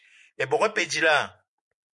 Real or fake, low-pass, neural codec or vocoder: real; 10.8 kHz; none